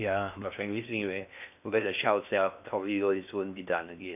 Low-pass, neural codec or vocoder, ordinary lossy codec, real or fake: 3.6 kHz; codec, 16 kHz in and 24 kHz out, 0.8 kbps, FocalCodec, streaming, 65536 codes; none; fake